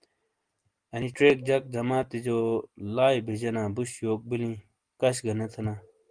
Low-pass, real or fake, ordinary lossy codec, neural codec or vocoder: 9.9 kHz; real; Opus, 24 kbps; none